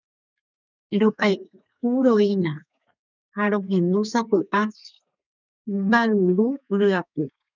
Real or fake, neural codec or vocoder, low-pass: fake; codec, 32 kHz, 1.9 kbps, SNAC; 7.2 kHz